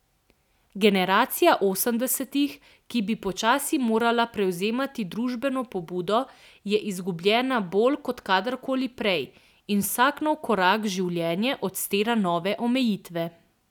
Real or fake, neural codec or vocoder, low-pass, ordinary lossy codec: real; none; 19.8 kHz; none